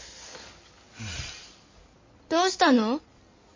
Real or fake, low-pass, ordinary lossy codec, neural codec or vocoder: fake; 7.2 kHz; MP3, 32 kbps; codec, 16 kHz in and 24 kHz out, 2.2 kbps, FireRedTTS-2 codec